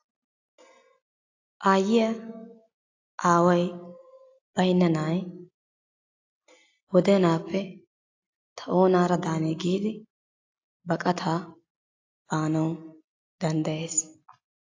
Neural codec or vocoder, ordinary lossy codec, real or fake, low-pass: none; AAC, 32 kbps; real; 7.2 kHz